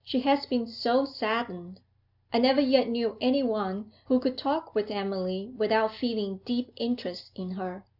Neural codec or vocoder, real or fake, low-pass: none; real; 5.4 kHz